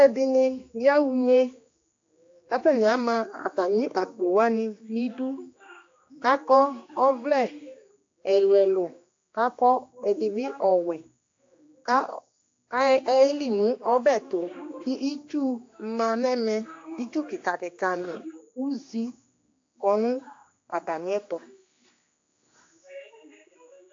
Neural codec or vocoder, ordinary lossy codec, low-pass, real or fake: codec, 16 kHz, 2 kbps, X-Codec, HuBERT features, trained on general audio; AAC, 48 kbps; 7.2 kHz; fake